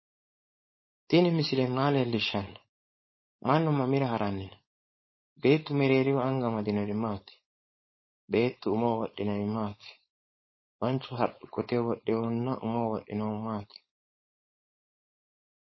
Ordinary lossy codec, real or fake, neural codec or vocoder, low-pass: MP3, 24 kbps; fake; codec, 16 kHz, 4.8 kbps, FACodec; 7.2 kHz